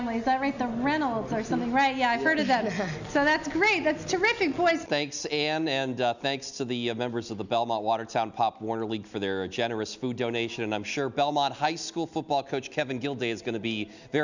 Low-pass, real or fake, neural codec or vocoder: 7.2 kHz; real; none